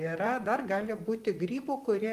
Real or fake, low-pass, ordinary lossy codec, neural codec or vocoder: fake; 14.4 kHz; Opus, 32 kbps; vocoder, 44.1 kHz, 128 mel bands, Pupu-Vocoder